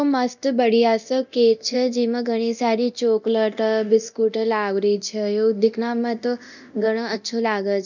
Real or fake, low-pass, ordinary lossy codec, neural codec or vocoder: fake; 7.2 kHz; none; codec, 24 kHz, 0.9 kbps, DualCodec